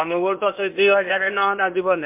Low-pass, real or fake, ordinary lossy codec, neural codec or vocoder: 3.6 kHz; fake; MP3, 32 kbps; codec, 16 kHz, 0.8 kbps, ZipCodec